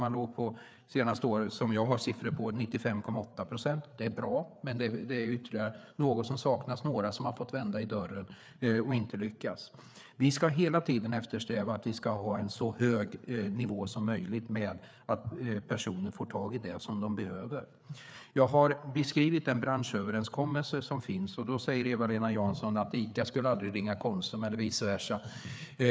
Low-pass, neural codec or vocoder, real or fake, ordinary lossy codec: none; codec, 16 kHz, 4 kbps, FreqCodec, larger model; fake; none